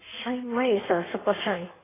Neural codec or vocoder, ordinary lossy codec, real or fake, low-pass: codec, 16 kHz in and 24 kHz out, 1.1 kbps, FireRedTTS-2 codec; AAC, 16 kbps; fake; 3.6 kHz